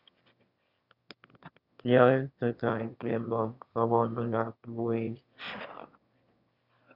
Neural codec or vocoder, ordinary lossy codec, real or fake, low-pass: autoencoder, 22.05 kHz, a latent of 192 numbers a frame, VITS, trained on one speaker; Opus, 64 kbps; fake; 5.4 kHz